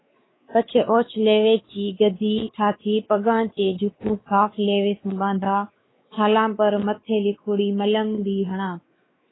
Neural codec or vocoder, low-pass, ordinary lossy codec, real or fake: codec, 16 kHz, 4 kbps, X-Codec, WavLM features, trained on Multilingual LibriSpeech; 7.2 kHz; AAC, 16 kbps; fake